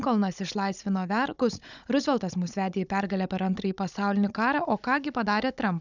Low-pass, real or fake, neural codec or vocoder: 7.2 kHz; fake; codec, 16 kHz, 16 kbps, FunCodec, trained on Chinese and English, 50 frames a second